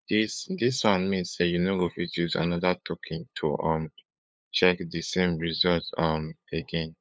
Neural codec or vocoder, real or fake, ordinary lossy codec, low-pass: codec, 16 kHz, 8 kbps, FunCodec, trained on LibriTTS, 25 frames a second; fake; none; none